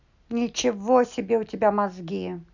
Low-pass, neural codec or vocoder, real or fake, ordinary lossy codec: 7.2 kHz; none; real; none